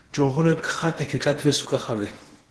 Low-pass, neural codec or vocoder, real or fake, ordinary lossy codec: 10.8 kHz; codec, 16 kHz in and 24 kHz out, 0.8 kbps, FocalCodec, streaming, 65536 codes; fake; Opus, 16 kbps